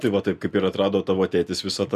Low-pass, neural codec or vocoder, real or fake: 14.4 kHz; none; real